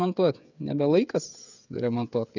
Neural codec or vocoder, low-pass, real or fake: codec, 16 kHz, 8 kbps, FreqCodec, smaller model; 7.2 kHz; fake